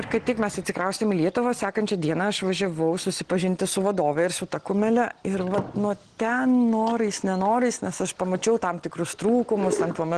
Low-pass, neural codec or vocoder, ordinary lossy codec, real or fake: 9.9 kHz; none; Opus, 16 kbps; real